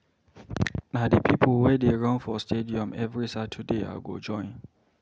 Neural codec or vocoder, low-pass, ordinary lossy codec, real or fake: none; none; none; real